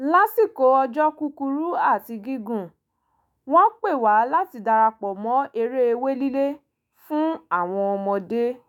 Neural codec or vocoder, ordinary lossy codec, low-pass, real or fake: autoencoder, 48 kHz, 128 numbers a frame, DAC-VAE, trained on Japanese speech; none; 19.8 kHz; fake